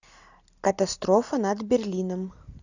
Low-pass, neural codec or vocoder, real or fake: 7.2 kHz; none; real